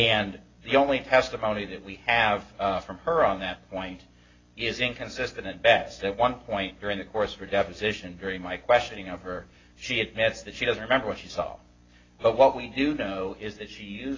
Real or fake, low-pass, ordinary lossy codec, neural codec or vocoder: real; 7.2 kHz; AAC, 32 kbps; none